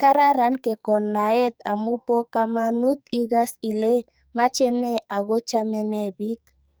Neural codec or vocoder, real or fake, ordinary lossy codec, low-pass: codec, 44.1 kHz, 2.6 kbps, SNAC; fake; none; none